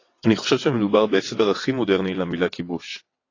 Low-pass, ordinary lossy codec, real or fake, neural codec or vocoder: 7.2 kHz; AAC, 32 kbps; fake; vocoder, 22.05 kHz, 80 mel bands, WaveNeXt